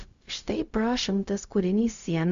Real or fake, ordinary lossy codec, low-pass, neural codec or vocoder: fake; MP3, 64 kbps; 7.2 kHz; codec, 16 kHz, 0.4 kbps, LongCat-Audio-Codec